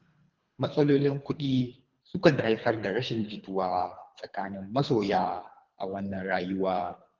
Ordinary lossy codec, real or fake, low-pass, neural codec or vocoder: Opus, 32 kbps; fake; 7.2 kHz; codec, 24 kHz, 3 kbps, HILCodec